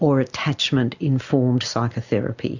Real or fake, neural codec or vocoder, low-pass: real; none; 7.2 kHz